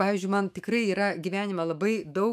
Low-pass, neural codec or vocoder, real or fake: 14.4 kHz; autoencoder, 48 kHz, 128 numbers a frame, DAC-VAE, trained on Japanese speech; fake